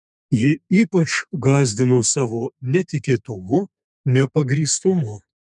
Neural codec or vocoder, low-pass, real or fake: codec, 32 kHz, 1.9 kbps, SNAC; 10.8 kHz; fake